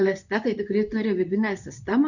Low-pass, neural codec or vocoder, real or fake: 7.2 kHz; codec, 24 kHz, 0.9 kbps, WavTokenizer, medium speech release version 2; fake